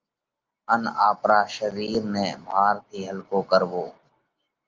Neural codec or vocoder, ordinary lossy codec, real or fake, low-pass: none; Opus, 32 kbps; real; 7.2 kHz